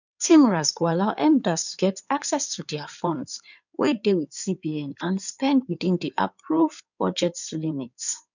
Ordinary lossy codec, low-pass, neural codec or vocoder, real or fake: none; 7.2 kHz; codec, 16 kHz in and 24 kHz out, 2.2 kbps, FireRedTTS-2 codec; fake